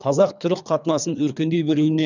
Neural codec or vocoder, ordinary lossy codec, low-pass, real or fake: codec, 24 kHz, 3 kbps, HILCodec; none; 7.2 kHz; fake